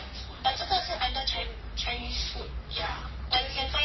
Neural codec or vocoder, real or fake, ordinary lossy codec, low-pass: codec, 44.1 kHz, 3.4 kbps, Pupu-Codec; fake; MP3, 24 kbps; 7.2 kHz